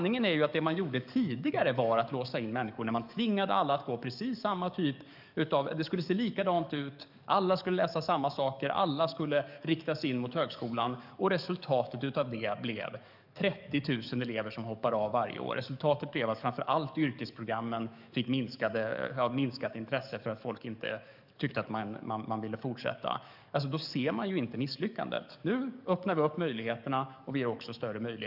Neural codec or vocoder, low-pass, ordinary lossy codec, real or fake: codec, 44.1 kHz, 7.8 kbps, DAC; 5.4 kHz; none; fake